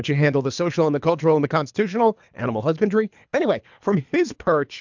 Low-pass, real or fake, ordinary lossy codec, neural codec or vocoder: 7.2 kHz; fake; MP3, 64 kbps; codec, 24 kHz, 3 kbps, HILCodec